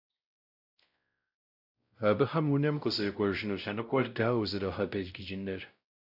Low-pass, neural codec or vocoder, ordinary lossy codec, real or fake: 5.4 kHz; codec, 16 kHz, 0.5 kbps, X-Codec, WavLM features, trained on Multilingual LibriSpeech; AAC, 48 kbps; fake